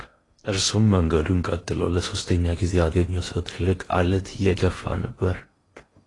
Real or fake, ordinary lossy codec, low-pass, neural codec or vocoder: fake; AAC, 32 kbps; 10.8 kHz; codec, 16 kHz in and 24 kHz out, 0.8 kbps, FocalCodec, streaming, 65536 codes